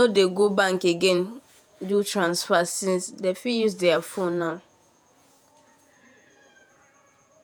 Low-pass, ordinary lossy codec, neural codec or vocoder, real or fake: none; none; vocoder, 48 kHz, 128 mel bands, Vocos; fake